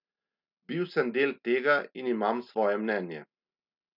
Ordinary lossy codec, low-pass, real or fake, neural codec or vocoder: none; 5.4 kHz; real; none